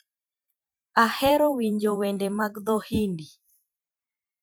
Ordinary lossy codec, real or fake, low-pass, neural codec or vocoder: none; fake; none; vocoder, 44.1 kHz, 128 mel bands every 512 samples, BigVGAN v2